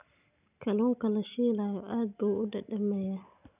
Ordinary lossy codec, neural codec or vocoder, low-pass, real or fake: none; none; 3.6 kHz; real